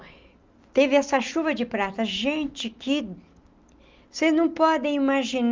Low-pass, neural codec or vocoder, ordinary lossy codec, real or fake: 7.2 kHz; none; Opus, 24 kbps; real